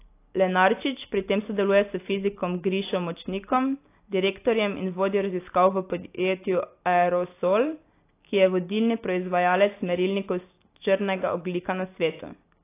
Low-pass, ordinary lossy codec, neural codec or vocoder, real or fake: 3.6 kHz; AAC, 24 kbps; none; real